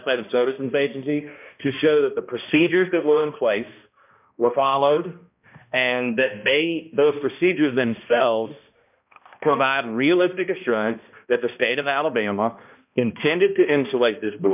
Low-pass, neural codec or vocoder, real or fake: 3.6 kHz; codec, 16 kHz, 1 kbps, X-Codec, HuBERT features, trained on balanced general audio; fake